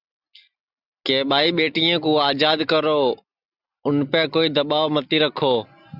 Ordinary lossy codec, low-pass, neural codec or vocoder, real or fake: Opus, 64 kbps; 5.4 kHz; none; real